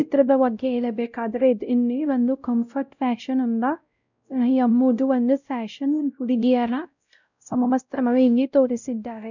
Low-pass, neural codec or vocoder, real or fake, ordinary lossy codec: 7.2 kHz; codec, 16 kHz, 0.5 kbps, X-Codec, WavLM features, trained on Multilingual LibriSpeech; fake; none